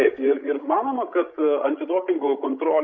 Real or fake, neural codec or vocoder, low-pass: fake; codec, 16 kHz, 16 kbps, FreqCodec, larger model; 7.2 kHz